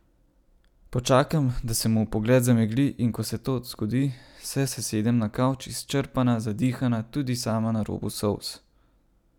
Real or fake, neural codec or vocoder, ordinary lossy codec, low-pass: real; none; none; 19.8 kHz